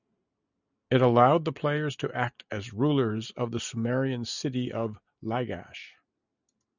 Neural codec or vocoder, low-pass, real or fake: none; 7.2 kHz; real